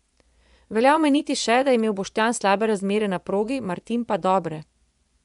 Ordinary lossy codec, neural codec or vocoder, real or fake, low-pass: none; vocoder, 24 kHz, 100 mel bands, Vocos; fake; 10.8 kHz